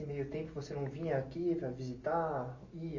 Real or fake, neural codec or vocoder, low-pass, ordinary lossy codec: real; none; 7.2 kHz; MP3, 32 kbps